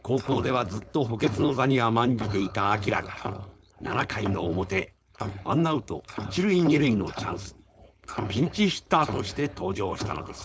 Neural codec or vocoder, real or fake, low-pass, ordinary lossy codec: codec, 16 kHz, 4.8 kbps, FACodec; fake; none; none